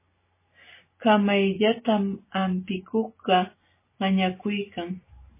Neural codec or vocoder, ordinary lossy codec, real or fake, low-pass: none; MP3, 16 kbps; real; 3.6 kHz